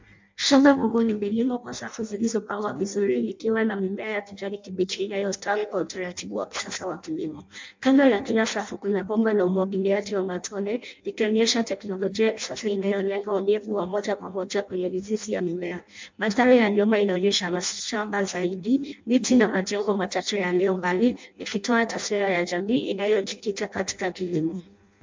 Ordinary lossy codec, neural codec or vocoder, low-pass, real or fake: MP3, 64 kbps; codec, 16 kHz in and 24 kHz out, 0.6 kbps, FireRedTTS-2 codec; 7.2 kHz; fake